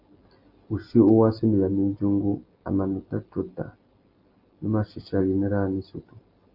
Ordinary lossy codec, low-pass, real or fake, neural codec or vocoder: Opus, 32 kbps; 5.4 kHz; real; none